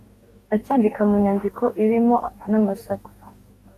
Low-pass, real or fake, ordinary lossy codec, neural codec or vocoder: 14.4 kHz; fake; AAC, 64 kbps; codec, 44.1 kHz, 2.6 kbps, DAC